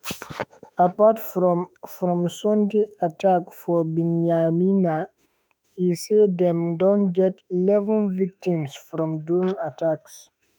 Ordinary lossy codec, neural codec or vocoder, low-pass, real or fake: none; autoencoder, 48 kHz, 32 numbers a frame, DAC-VAE, trained on Japanese speech; none; fake